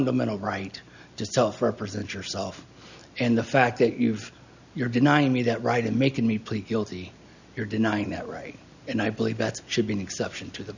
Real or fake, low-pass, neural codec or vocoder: real; 7.2 kHz; none